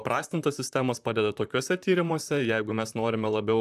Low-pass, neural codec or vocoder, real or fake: 14.4 kHz; vocoder, 44.1 kHz, 128 mel bands, Pupu-Vocoder; fake